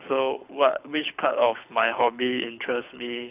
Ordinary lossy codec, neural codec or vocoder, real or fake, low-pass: none; codec, 24 kHz, 6 kbps, HILCodec; fake; 3.6 kHz